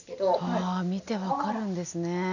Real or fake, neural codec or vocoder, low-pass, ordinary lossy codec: real; none; 7.2 kHz; none